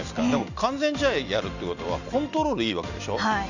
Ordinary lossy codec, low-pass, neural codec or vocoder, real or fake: none; 7.2 kHz; none; real